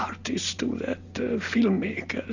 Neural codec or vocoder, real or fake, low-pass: none; real; 7.2 kHz